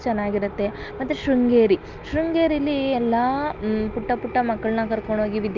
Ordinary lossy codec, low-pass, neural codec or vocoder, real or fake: Opus, 24 kbps; 7.2 kHz; none; real